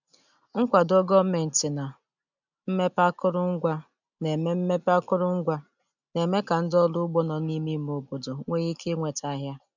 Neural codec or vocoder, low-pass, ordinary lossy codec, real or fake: none; 7.2 kHz; none; real